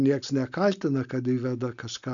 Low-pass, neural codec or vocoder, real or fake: 7.2 kHz; none; real